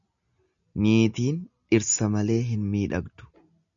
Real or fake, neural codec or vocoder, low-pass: real; none; 7.2 kHz